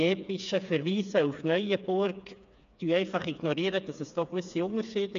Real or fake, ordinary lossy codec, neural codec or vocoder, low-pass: fake; MP3, 64 kbps; codec, 16 kHz, 4 kbps, FreqCodec, smaller model; 7.2 kHz